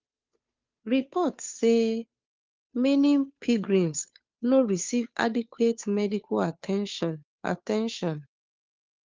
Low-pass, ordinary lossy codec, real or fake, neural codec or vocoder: 7.2 kHz; Opus, 24 kbps; fake; codec, 16 kHz, 2 kbps, FunCodec, trained on Chinese and English, 25 frames a second